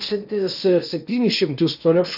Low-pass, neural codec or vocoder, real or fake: 5.4 kHz; codec, 16 kHz in and 24 kHz out, 0.8 kbps, FocalCodec, streaming, 65536 codes; fake